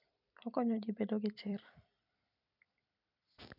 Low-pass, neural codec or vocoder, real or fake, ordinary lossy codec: 5.4 kHz; vocoder, 44.1 kHz, 128 mel bands every 256 samples, BigVGAN v2; fake; none